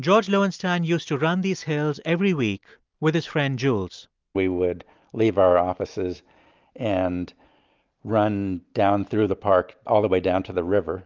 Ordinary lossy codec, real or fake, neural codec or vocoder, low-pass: Opus, 32 kbps; real; none; 7.2 kHz